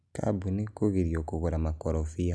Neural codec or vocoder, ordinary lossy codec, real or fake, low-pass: none; none; real; none